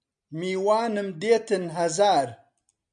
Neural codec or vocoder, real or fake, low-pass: none; real; 9.9 kHz